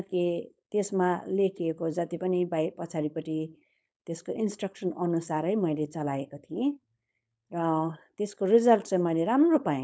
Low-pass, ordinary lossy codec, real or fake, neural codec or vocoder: none; none; fake; codec, 16 kHz, 4.8 kbps, FACodec